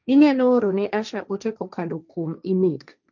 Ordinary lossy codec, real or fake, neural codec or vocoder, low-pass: none; fake; codec, 16 kHz, 1.1 kbps, Voila-Tokenizer; 7.2 kHz